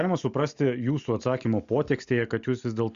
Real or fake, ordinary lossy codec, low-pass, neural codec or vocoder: real; Opus, 64 kbps; 7.2 kHz; none